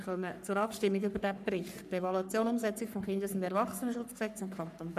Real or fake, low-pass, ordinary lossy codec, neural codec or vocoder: fake; 14.4 kHz; none; codec, 44.1 kHz, 3.4 kbps, Pupu-Codec